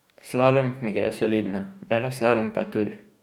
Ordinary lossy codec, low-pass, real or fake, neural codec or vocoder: none; 19.8 kHz; fake; codec, 44.1 kHz, 2.6 kbps, DAC